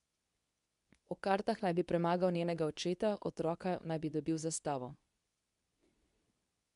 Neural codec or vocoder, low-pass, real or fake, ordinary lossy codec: codec, 24 kHz, 0.9 kbps, WavTokenizer, medium speech release version 2; 10.8 kHz; fake; none